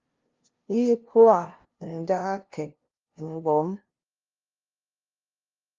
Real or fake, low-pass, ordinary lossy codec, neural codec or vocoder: fake; 7.2 kHz; Opus, 32 kbps; codec, 16 kHz, 0.5 kbps, FunCodec, trained on LibriTTS, 25 frames a second